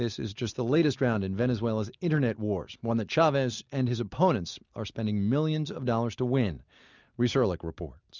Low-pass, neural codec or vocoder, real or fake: 7.2 kHz; none; real